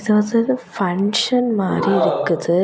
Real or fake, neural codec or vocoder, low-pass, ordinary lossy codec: real; none; none; none